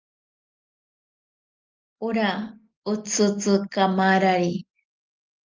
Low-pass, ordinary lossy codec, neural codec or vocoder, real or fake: 7.2 kHz; Opus, 32 kbps; none; real